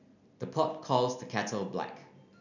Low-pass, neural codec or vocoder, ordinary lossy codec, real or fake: 7.2 kHz; none; none; real